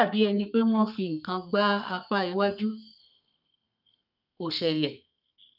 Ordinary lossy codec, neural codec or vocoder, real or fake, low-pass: none; codec, 32 kHz, 1.9 kbps, SNAC; fake; 5.4 kHz